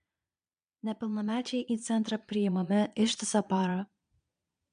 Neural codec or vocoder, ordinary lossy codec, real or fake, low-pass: vocoder, 22.05 kHz, 80 mel bands, Vocos; MP3, 64 kbps; fake; 9.9 kHz